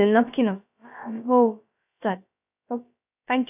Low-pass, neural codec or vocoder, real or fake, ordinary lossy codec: 3.6 kHz; codec, 16 kHz, about 1 kbps, DyCAST, with the encoder's durations; fake; none